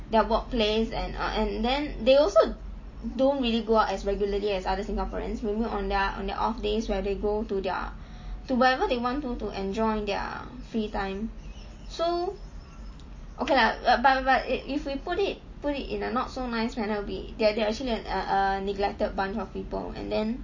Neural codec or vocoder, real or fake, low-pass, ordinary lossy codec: none; real; 7.2 kHz; MP3, 32 kbps